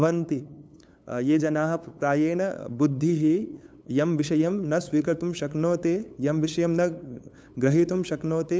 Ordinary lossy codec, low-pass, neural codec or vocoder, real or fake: none; none; codec, 16 kHz, 8 kbps, FunCodec, trained on LibriTTS, 25 frames a second; fake